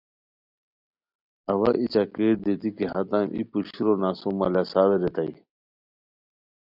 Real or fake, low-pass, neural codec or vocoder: real; 5.4 kHz; none